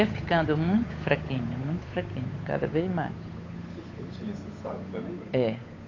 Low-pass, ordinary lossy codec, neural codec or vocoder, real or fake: 7.2 kHz; AAC, 32 kbps; codec, 16 kHz, 8 kbps, FunCodec, trained on Chinese and English, 25 frames a second; fake